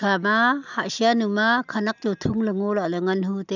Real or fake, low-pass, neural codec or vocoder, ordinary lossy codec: real; 7.2 kHz; none; none